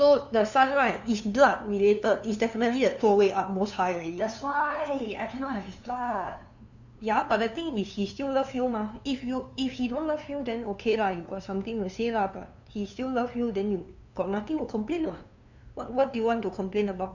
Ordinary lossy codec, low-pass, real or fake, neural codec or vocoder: none; 7.2 kHz; fake; codec, 16 kHz, 2 kbps, FunCodec, trained on LibriTTS, 25 frames a second